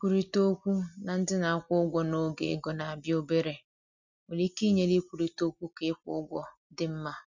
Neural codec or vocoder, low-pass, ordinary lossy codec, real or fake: none; 7.2 kHz; none; real